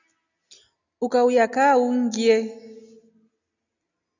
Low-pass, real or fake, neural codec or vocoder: 7.2 kHz; real; none